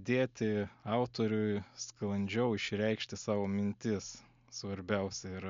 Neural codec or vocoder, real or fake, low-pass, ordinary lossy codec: none; real; 7.2 kHz; MP3, 64 kbps